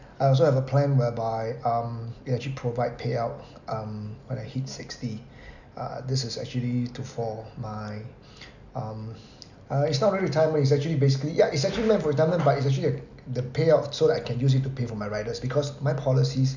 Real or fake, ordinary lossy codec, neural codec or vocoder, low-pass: real; none; none; 7.2 kHz